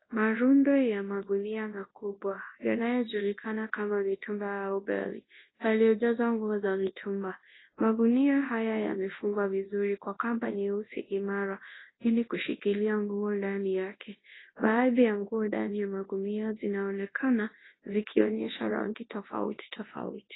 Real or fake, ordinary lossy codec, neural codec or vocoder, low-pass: fake; AAC, 16 kbps; codec, 24 kHz, 0.9 kbps, WavTokenizer, large speech release; 7.2 kHz